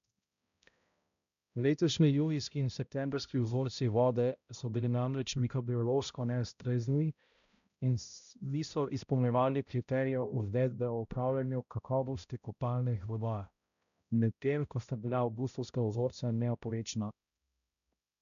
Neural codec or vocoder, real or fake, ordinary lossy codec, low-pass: codec, 16 kHz, 0.5 kbps, X-Codec, HuBERT features, trained on balanced general audio; fake; none; 7.2 kHz